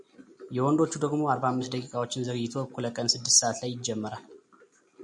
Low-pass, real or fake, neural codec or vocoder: 10.8 kHz; real; none